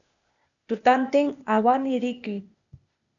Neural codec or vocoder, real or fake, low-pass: codec, 16 kHz, 0.8 kbps, ZipCodec; fake; 7.2 kHz